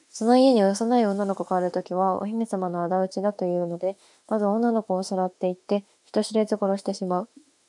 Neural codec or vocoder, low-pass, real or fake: autoencoder, 48 kHz, 32 numbers a frame, DAC-VAE, trained on Japanese speech; 10.8 kHz; fake